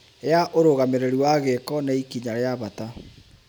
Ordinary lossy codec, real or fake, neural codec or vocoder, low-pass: none; real; none; none